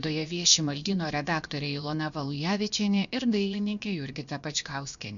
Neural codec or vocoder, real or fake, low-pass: codec, 16 kHz, about 1 kbps, DyCAST, with the encoder's durations; fake; 7.2 kHz